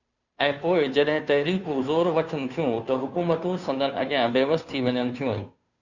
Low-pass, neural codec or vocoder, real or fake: 7.2 kHz; codec, 16 kHz, 2 kbps, FunCodec, trained on Chinese and English, 25 frames a second; fake